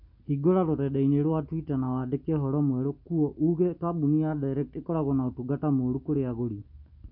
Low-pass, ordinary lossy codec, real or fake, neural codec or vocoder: 5.4 kHz; none; real; none